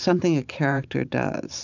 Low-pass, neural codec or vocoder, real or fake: 7.2 kHz; vocoder, 44.1 kHz, 128 mel bands every 512 samples, BigVGAN v2; fake